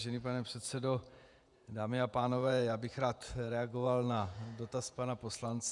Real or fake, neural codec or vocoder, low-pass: fake; vocoder, 48 kHz, 128 mel bands, Vocos; 10.8 kHz